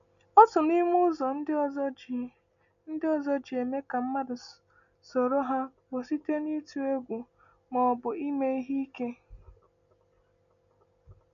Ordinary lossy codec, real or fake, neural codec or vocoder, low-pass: none; real; none; 7.2 kHz